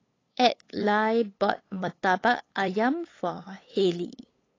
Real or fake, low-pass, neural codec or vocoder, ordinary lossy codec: fake; 7.2 kHz; codec, 16 kHz, 8 kbps, FunCodec, trained on LibriTTS, 25 frames a second; AAC, 32 kbps